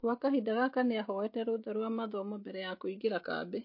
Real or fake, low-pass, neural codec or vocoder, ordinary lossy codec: real; 5.4 kHz; none; MP3, 32 kbps